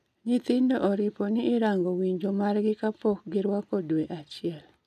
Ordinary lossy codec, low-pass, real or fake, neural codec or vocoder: none; 14.4 kHz; real; none